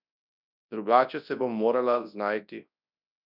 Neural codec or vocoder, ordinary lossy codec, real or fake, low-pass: codec, 24 kHz, 0.9 kbps, WavTokenizer, large speech release; none; fake; 5.4 kHz